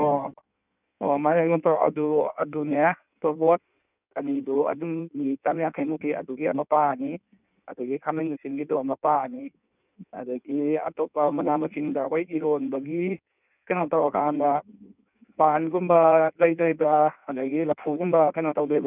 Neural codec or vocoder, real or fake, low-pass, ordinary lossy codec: codec, 16 kHz in and 24 kHz out, 1.1 kbps, FireRedTTS-2 codec; fake; 3.6 kHz; none